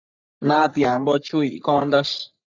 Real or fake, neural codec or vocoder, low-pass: fake; codec, 44.1 kHz, 3.4 kbps, Pupu-Codec; 7.2 kHz